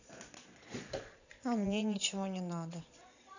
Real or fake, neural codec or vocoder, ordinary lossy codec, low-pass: fake; vocoder, 22.05 kHz, 80 mel bands, Vocos; none; 7.2 kHz